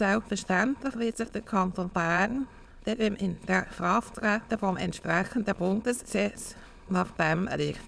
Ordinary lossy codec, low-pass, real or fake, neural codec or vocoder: none; none; fake; autoencoder, 22.05 kHz, a latent of 192 numbers a frame, VITS, trained on many speakers